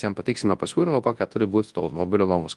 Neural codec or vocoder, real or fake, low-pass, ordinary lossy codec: codec, 24 kHz, 0.9 kbps, WavTokenizer, large speech release; fake; 10.8 kHz; Opus, 24 kbps